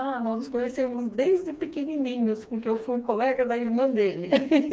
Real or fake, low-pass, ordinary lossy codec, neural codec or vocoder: fake; none; none; codec, 16 kHz, 2 kbps, FreqCodec, smaller model